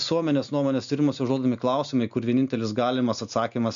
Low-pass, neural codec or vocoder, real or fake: 7.2 kHz; none; real